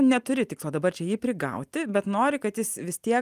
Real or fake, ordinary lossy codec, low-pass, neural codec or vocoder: real; Opus, 32 kbps; 14.4 kHz; none